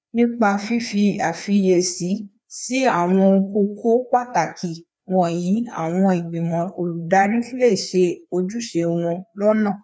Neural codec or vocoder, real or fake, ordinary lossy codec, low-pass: codec, 16 kHz, 2 kbps, FreqCodec, larger model; fake; none; none